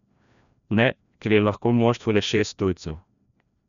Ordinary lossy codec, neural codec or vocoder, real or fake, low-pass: none; codec, 16 kHz, 1 kbps, FreqCodec, larger model; fake; 7.2 kHz